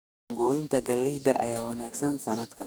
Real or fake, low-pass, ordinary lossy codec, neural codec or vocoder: fake; none; none; codec, 44.1 kHz, 2.6 kbps, DAC